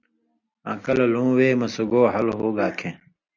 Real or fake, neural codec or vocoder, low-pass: real; none; 7.2 kHz